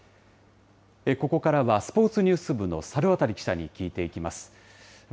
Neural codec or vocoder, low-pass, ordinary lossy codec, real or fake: none; none; none; real